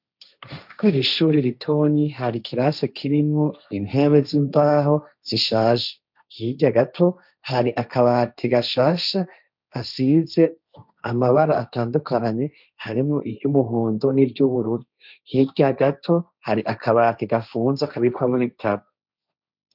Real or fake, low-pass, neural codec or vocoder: fake; 5.4 kHz; codec, 16 kHz, 1.1 kbps, Voila-Tokenizer